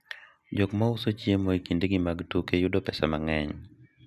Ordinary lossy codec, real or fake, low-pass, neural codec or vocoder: none; real; 14.4 kHz; none